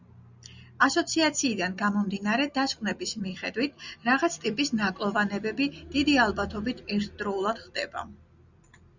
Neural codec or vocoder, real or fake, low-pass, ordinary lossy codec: none; real; 7.2 kHz; Opus, 64 kbps